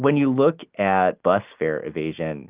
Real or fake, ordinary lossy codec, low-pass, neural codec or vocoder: real; Opus, 24 kbps; 3.6 kHz; none